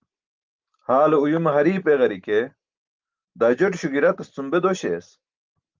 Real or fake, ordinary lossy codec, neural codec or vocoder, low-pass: real; Opus, 24 kbps; none; 7.2 kHz